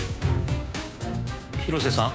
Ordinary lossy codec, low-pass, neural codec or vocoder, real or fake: none; none; codec, 16 kHz, 6 kbps, DAC; fake